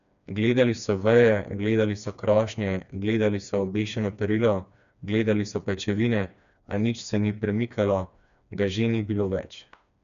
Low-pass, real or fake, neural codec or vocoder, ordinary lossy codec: 7.2 kHz; fake; codec, 16 kHz, 2 kbps, FreqCodec, smaller model; none